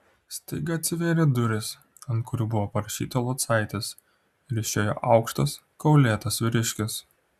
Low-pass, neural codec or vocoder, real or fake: 14.4 kHz; none; real